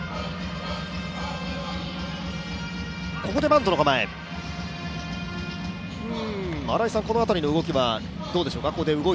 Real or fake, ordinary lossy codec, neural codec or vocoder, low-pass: real; none; none; none